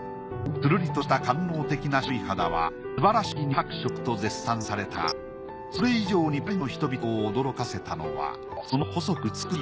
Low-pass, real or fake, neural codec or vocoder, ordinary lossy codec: none; real; none; none